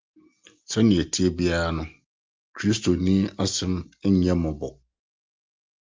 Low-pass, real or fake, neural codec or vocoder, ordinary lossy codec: 7.2 kHz; real; none; Opus, 32 kbps